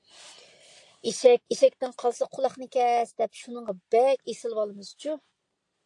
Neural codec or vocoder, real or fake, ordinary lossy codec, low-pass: none; real; AAC, 64 kbps; 10.8 kHz